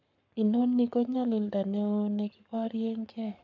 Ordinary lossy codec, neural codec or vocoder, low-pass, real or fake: none; codec, 44.1 kHz, 7.8 kbps, Pupu-Codec; 7.2 kHz; fake